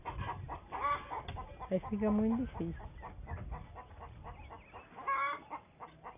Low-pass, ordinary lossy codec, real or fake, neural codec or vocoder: 3.6 kHz; none; real; none